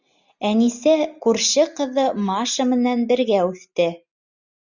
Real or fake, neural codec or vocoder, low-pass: real; none; 7.2 kHz